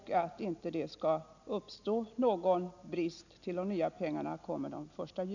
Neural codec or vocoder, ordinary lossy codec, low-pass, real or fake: none; none; 7.2 kHz; real